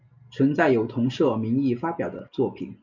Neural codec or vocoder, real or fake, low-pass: none; real; 7.2 kHz